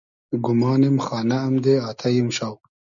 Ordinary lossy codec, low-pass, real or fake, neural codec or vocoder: MP3, 96 kbps; 7.2 kHz; real; none